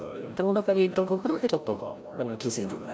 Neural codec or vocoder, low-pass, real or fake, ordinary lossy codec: codec, 16 kHz, 0.5 kbps, FreqCodec, larger model; none; fake; none